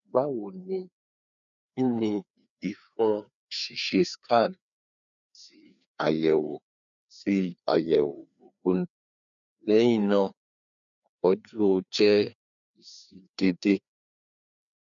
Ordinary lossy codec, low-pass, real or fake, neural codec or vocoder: none; 7.2 kHz; fake; codec, 16 kHz, 2 kbps, FreqCodec, larger model